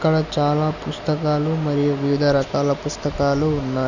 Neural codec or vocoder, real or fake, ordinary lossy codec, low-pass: none; real; none; 7.2 kHz